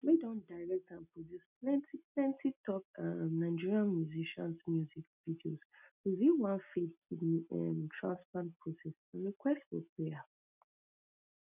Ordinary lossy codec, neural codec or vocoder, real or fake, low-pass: none; none; real; 3.6 kHz